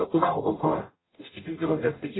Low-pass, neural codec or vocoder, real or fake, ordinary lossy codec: 7.2 kHz; codec, 44.1 kHz, 0.9 kbps, DAC; fake; AAC, 16 kbps